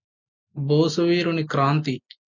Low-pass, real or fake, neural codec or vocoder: 7.2 kHz; real; none